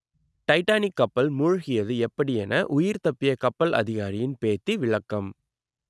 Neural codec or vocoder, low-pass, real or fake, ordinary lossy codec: none; none; real; none